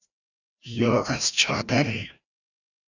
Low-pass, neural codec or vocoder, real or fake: 7.2 kHz; codec, 16 kHz, 1 kbps, FreqCodec, larger model; fake